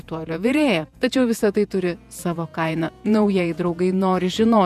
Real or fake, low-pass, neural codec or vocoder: fake; 14.4 kHz; vocoder, 48 kHz, 128 mel bands, Vocos